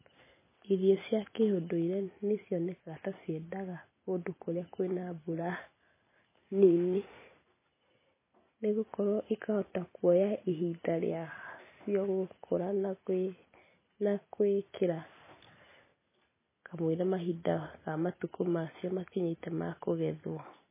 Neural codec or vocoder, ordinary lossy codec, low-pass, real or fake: none; MP3, 16 kbps; 3.6 kHz; real